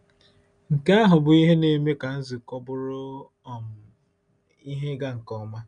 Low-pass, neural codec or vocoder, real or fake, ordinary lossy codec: 9.9 kHz; none; real; none